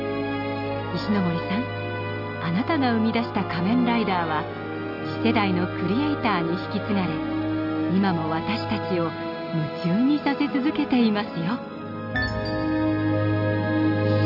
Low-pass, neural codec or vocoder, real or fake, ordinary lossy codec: 5.4 kHz; none; real; none